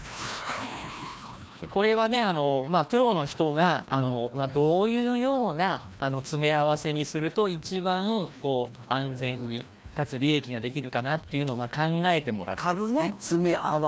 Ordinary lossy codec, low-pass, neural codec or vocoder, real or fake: none; none; codec, 16 kHz, 1 kbps, FreqCodec, larger model; fake